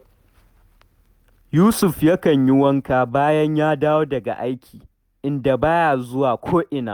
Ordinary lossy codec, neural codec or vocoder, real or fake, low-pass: Opus, 64 kbps; none; real; 19.8 kHz